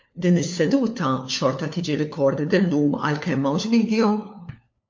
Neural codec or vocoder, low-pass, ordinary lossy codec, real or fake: codec, 16 kHz, 4 kbps, FunCodec, trained on LibriTTS, 50 frames a second; 7.2 kHz; MP3, 48 kbps; fake